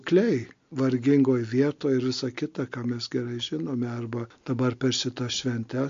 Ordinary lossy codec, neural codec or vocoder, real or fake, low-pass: MP3, 48 kbps; none; real; 7.2 kHz